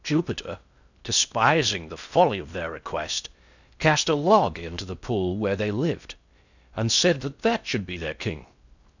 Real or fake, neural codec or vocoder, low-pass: fake; codec, 16 kHz in and 24 kHz out, 0.6 kbps, FocalCodec, streaming, 4096 codes; 7.2 kHz